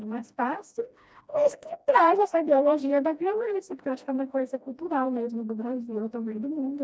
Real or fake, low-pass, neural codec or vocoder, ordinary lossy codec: fake; none; codec, 16 kHz, 1 kbps, FreqCodec, smaller model; none